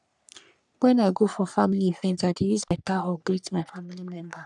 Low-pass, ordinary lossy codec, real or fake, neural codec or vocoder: 10.8 kHz; none; fake; codec, 44.1 kHz, 3.4 kbps, Pupu-Codec